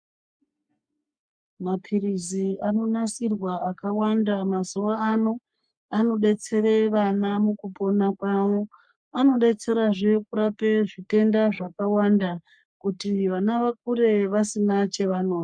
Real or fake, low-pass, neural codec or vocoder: fake; 9.9 kHz; codec, 44.1 kHz, 3.4 kbps, Pupu-Codec